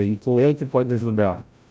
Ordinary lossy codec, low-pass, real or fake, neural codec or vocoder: none; none; fake; codec, 16 kHz, 0.5 kbps, FreqCodec, larger model